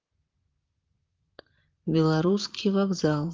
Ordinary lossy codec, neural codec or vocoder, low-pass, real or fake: Opus, 32 kbps; vocoder, 44.1 kHz, 128 mel bands, Pupu-Vocoder; 7.2 kHz; fake